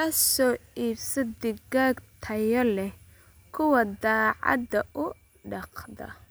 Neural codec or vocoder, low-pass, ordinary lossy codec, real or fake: none; none; none; real